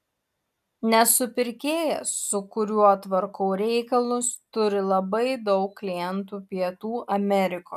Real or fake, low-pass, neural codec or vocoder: real; 14.4 kHz; none